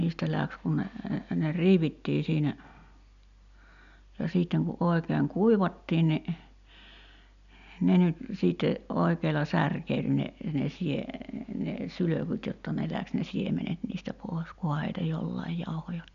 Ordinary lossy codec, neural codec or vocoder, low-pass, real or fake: none; none; 7.2 kHz; real